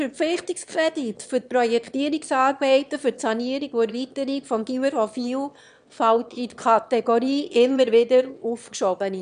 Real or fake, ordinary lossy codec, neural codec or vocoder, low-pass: fake; none; autoencoder, 22.05 kHz, a latent of 192 numbers a frame, VITS, trained on one speaker; 9.9 kHz